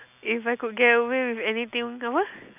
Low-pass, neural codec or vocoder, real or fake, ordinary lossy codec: 3.6 kHz; none; real; none